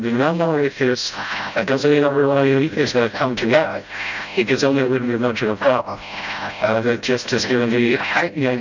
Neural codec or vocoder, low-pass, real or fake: codec, 16 kHz, 0.5 kbps, FreqCodec, smaller model; 7.2 kHz; fake